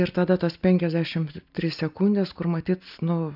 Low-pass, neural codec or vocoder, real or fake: 5.4 kHz; none; real